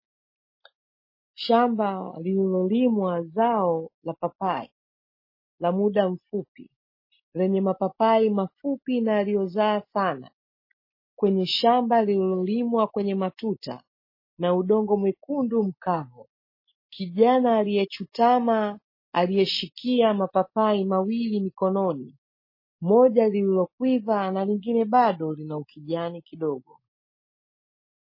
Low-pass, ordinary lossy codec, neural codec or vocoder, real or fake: 5.4 kHz; MP3, 24 kbps; none; real